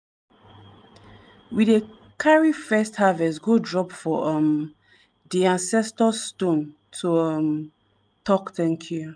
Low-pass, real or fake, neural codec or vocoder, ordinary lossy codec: 9.9 kHz; real; none; none